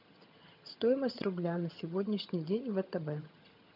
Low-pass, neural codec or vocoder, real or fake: 5.4 kHz; vocoder, 22.05 kHz, 80 mel bands, HiFi-GAN; fake